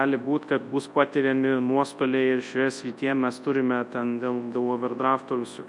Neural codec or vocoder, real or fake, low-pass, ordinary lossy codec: codec, 24 kHz, 0.9 kbps, WavTokenizer, large speech release; fake; 10.8 kHz; MP3, 64 kbps